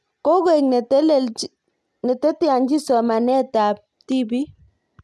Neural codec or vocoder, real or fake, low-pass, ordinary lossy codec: none; real; none; none